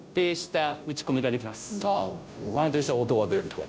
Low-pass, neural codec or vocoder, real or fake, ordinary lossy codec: none; codec, 16 kHz, 0.5 kbps, FunCodec, trained on Chinese and English, 25 frames a second; fake; none